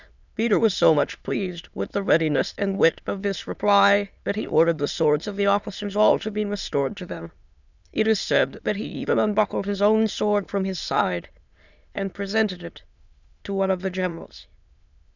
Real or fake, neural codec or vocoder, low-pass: fake; autoencoder, 22.05 kHz, a latent of 192 numbers a frame, VITS, trained on many speakers; 7.2 kHz